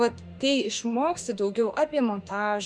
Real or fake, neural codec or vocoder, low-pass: fake; autoencoder, 48 kHz, 32 numbers a frame, DAC-VAE, trained on Japanese speech; 9.9 kHz